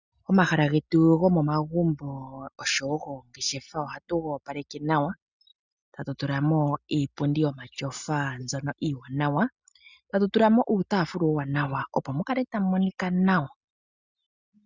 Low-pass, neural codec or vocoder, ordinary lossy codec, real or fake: 7.2 kHz; none; Opus, 64 kbps; real